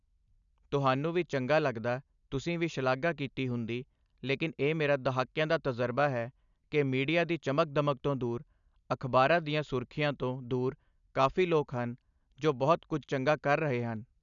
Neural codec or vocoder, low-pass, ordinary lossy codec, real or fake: none; 7.2 kHz; none; real